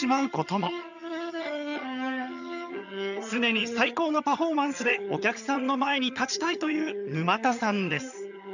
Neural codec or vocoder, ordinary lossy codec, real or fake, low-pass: vocoder, 22.05 kHz, 80 mel bands, HiFi-GAN; none; fake; 7.2 kHz